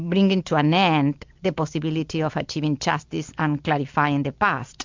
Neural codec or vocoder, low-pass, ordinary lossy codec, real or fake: none; 7.2 kHz; MP3, 64 kbps; real